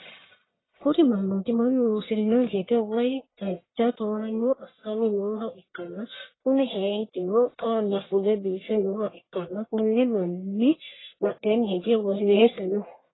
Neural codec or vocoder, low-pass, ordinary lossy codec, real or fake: codec, 44.1 kHz, 1.7 kbps, Pupu-Codec; 7.2 kHz; AAC, 16 kbps; fake